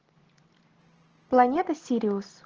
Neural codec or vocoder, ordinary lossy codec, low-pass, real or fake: none; Opus, 16 kbps; 7.2 kHz; real